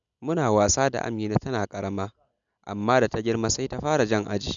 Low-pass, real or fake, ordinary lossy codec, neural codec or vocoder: 7.2 kHz; real; none; none